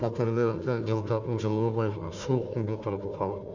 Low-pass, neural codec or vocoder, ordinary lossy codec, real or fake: 7.2 kHz; codec, 16 kHz, 1 kbps, FunCodec, trained on Chinese and English, 50 frames a second; Opus, 64 kbps; fake